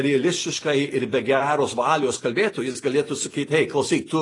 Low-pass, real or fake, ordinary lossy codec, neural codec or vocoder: 10.8 kHz; real; AAC, 32 kbps; none